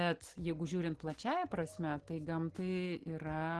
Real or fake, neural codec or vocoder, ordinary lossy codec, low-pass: real; none; Opus, 16 kbps; 10.8 kHz